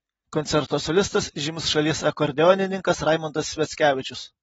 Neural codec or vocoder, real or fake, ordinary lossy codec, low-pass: none; real; AAC, 24 kbps; 19.8 kHz